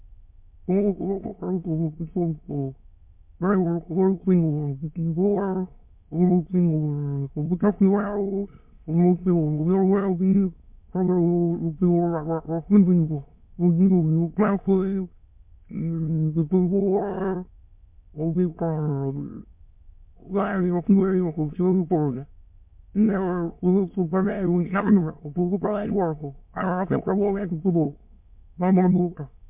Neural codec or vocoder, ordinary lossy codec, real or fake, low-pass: autoencoder, 22.05 kHz, a latent of 192 numbers a frame, VITS, trained on many speakers; MP3, 32 kbps; fake; 3.6 kHz